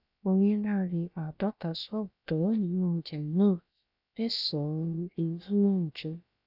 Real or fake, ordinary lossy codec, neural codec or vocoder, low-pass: fake; none; codec, 16 kHz, about 1 kbps, DyCAST, with the encoder's durations; 5.4 kHz